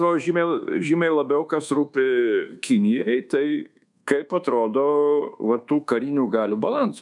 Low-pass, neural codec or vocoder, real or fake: 10.8 kHz; codec, 24 kHz, 1.2 kbps, DualCodec; fake